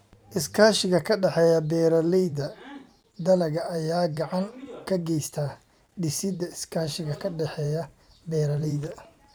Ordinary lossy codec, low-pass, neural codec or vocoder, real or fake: none; none; vocoder, 44.1 kHz, 128 mel bands every 512 samples, BigVGAN v2; fake